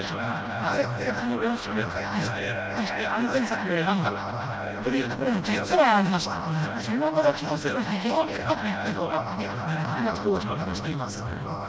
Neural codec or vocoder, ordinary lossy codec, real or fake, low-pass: codec, 16 kHz, 0.5 kbps, FreqCodec, smaller model; none; fake; none